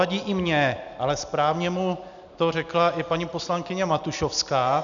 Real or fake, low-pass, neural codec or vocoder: real; 7.2 kHz; none